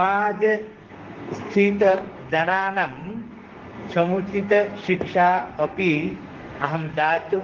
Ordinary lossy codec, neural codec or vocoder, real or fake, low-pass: Opus, 16 kbps; codec, 32 kHz, 1.9 kbps, SNAC; fake; 7.2 kHz